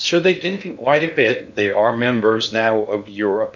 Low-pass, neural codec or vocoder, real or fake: 7.2 kHz; codec, 16 kHz in and 24 kHz out, 0.6 kbps, FocalCodec, streaming, 2048 codes; fake